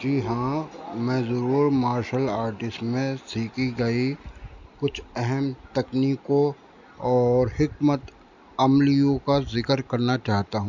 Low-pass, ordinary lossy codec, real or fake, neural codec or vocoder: 7.2 kHz; none; real; none